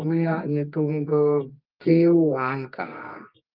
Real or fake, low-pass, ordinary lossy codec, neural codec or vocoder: fake; 5.4 kHz; Opus, 24 kbps; codec, 24 kHz, 0.9 kbps, WavTokenizer, medium music audio release